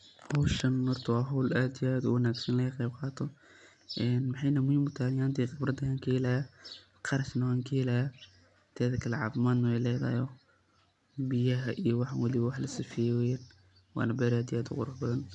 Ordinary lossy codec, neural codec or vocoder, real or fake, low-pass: none; none; real; 10.8 kHz